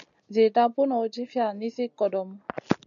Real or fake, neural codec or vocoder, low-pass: real; none; 7.2 kHz